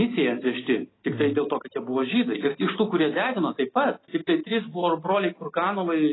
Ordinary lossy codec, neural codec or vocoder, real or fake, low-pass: AAC, 16 kbps; none; real; 7.2 kHz